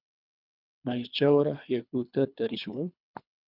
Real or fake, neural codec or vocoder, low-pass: fake; codec, 24 kHz, 3 kbps, HILCodec; 5.4 kHz